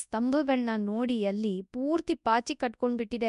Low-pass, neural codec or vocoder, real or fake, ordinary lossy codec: 10.8 kHz; codec, 24 kHz, 0.9 kbps, WavTokenizer, large speech release; fake; none